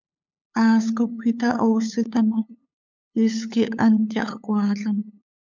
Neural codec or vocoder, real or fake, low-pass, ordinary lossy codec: codec, 16 kHz, 8 kbps, FunCodec, trained on LibriTTS, 25 frames a second; fake; 7.2 kHz; MP3, 64 kbps